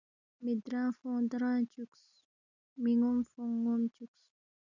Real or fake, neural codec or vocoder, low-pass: real; none; 7.2 kHz